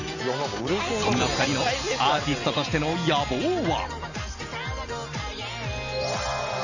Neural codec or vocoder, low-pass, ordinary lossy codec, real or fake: none; 7.2 kHz; none; real